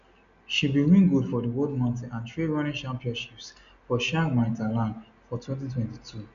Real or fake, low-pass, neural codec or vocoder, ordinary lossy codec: real; 7.2 kHz; none; none